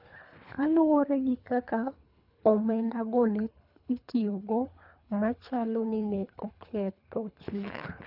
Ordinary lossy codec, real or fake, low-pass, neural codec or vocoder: none; fake; 5.4 kHz; codec, 24 kHz, 3 kbps, HILCodec